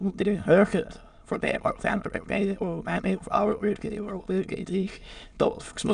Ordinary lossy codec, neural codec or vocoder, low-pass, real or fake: none; autoencoder, 22.05 kHz, a latent of 192 numbers a frame, VITS, trained on many speakers; 9.9 kHz; fake